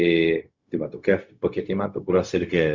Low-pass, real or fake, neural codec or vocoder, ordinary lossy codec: 7.2 kHz; fake; codec, 16 kHz, 0.4 kbps, LongCat-Audio-Codec; Opus, 64 kbps